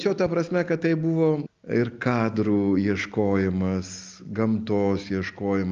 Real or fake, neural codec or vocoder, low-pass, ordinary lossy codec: real; none; 7.2 kHz; Opus, 24 kbps